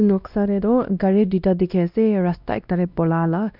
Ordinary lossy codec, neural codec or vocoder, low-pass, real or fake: none; codec, 16 kHz, 1 kbps, X-Codec, WavLM features, trained on Multilingual LibriSpeech; 5.4 kHz; fake